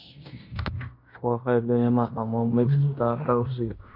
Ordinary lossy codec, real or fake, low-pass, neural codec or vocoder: MP3, 48 kbps; fake; 5.4 kHz; codec, 16 kHz in and 24 kHz out, 0.9 kbps, LongCat-Audio-Codec, fine tuned four codebook decoder